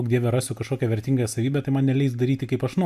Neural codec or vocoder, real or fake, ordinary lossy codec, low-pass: none; real; AAC, 96 kbps; 14.4 kHz